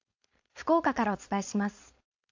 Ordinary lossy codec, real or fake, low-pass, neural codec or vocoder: AAC, 48 kbps; fake; 7.2 kHz; codec, 16 kHz, 4.8 kbps, FACodec